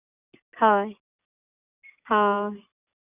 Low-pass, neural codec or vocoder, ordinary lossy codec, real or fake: 3.6 kHz; none; none; real